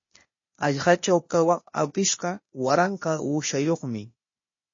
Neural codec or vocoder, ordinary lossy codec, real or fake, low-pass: codec, 16 kHz, 0.8 kbps, ZipCodec; MP3, 32 kbps; fake; 7.2 kHz